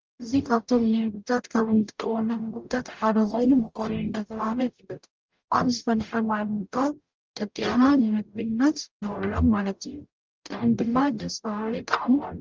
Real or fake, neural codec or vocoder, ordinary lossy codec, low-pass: fake; codec, 44.1 kHz, 0.9 kbps, DAC; Opus, 24 kbps; 7.2 kHz